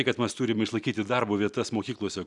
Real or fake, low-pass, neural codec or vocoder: real; 10.8 kHz; none